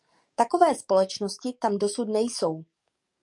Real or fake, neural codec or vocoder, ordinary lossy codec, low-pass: fake; codec, 44.1 kHz, 7.8 kbps, DAC; MP3, 64 kbps; 10.8 kHz